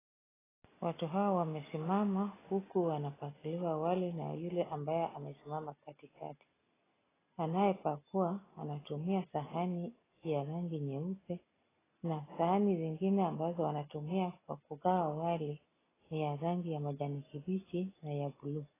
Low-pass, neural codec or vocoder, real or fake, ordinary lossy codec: 3.6 kHz; none; real; AAC, 16 kbps